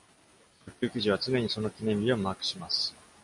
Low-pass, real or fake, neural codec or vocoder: 10.8 kHz; real; none